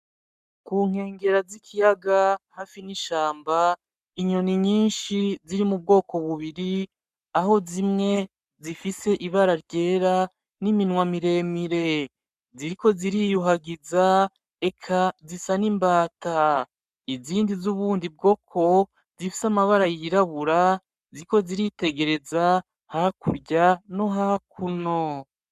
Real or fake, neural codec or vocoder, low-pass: fake; codec, 44.1 kHz, 7.8 kbps, Pupu-Codec; 14.4 kHz